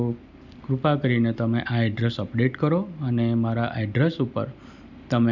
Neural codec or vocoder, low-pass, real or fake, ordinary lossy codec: none; 7.2 kHz; real; none